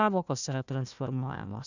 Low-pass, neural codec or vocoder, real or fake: 7.2 kHz; codec, 16 kHz, 1 kbps, FunCodec, trained on Chinese and English, 50 frames a second; fake